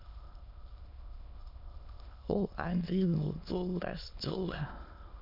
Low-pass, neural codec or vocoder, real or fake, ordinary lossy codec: 5.4 kHz; autoencoder, 22.05 kHz, a latent of 192 numbers a frame, VITS, trained on many speakers; fake; MP3, 48 kbps